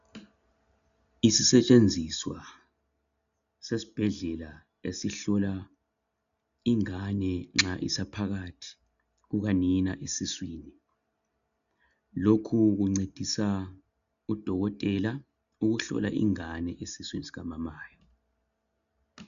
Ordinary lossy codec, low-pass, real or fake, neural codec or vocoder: AAC, 96 kbps; 7.2 kHz; real; none